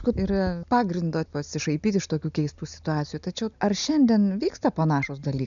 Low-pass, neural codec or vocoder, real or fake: 7.2 kHz; none; real